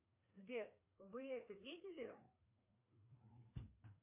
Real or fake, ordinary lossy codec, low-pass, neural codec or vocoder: fake; MP3, 24 kbps; 3.6 kHz; codec, 16 kHz, 2 kbps, FreqCodec, larger model